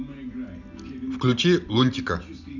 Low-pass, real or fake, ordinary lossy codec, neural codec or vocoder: 7.2 kHz; real; none; none